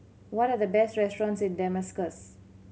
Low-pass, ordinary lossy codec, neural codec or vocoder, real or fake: none; none; none; real